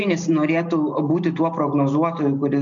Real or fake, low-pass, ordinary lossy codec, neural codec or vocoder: real; 7.2 kHz; MP3, 96 kbps; none